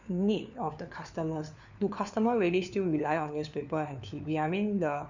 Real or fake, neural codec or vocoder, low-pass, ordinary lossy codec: fake; codec, 16 kHz, 4 kbps, FunCodec, trained on LibriTTS, 50 frames a second; 7.2 kHz; none